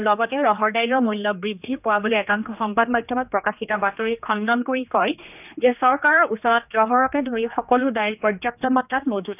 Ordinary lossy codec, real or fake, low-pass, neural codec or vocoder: none; fake; 3.6 kHz; codec, 16 kHz, 2 kbps, X-Codec, HuBERT features, trained on general audio